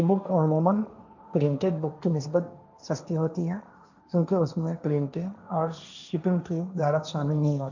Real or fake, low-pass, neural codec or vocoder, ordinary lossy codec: fake; 7.2 kHz; codec, 16 kHz, 1.1 kbps, Voila-Tokenizer; none